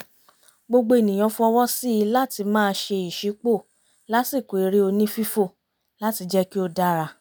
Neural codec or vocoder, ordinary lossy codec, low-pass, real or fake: none; none; none; real